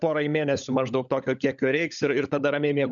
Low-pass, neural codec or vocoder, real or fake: 7.2 kHz; codec, 16 kHz, 16 kbps, FunCodec, trained on LibriTTS, 50 frames a second; fake